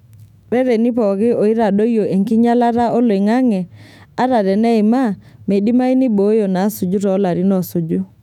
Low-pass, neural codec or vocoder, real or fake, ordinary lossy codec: 19.8 kHz; autoencoder, 48 kHz, 128 numbers a frame, DAC-VAE, trained on Japanese speech; fake; none